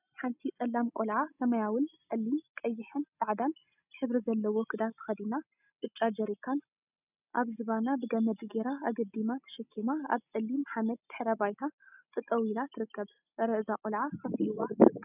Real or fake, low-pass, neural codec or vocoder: real; 3.6 kHz; none